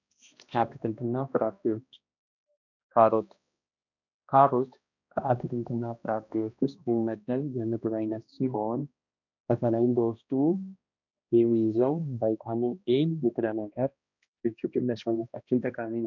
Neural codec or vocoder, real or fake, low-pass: codec, 16 kHz, 1 kbps, X-Codec, HuBERT features, trained on balanced general audio; fake; 7.2 kHz